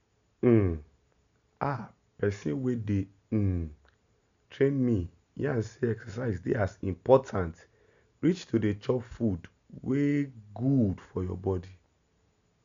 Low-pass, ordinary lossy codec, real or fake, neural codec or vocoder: 7.2 kHz; MP3, 64 kbps; real; none